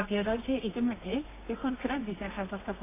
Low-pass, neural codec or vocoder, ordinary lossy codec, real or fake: 3.6 kHz; codec, 24 kHz, 0.9 kbps, WavTokenizer, medium music audio release; AAC, 16 kbps; fake